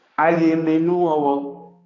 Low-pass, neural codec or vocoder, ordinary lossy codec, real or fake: 7.2 kHz; codec, 16 kHz, 2 kbps, X-Codec, HuBERT features, trained on balanced general audio; AAC, 32 kbps; fake